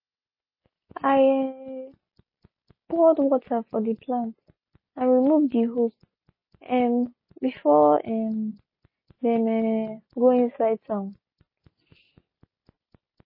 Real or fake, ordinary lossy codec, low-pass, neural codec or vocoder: real; MP3, 24 kbps; 5.4 kHz; none